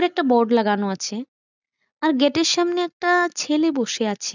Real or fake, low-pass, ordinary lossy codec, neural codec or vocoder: real; 7.2 kHz; none; none